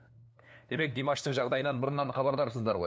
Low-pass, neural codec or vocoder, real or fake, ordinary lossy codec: none; codec, 16 kHz, 2 kbps, FunCodec, trained on LibriTTS, 25 frames a second; fake; none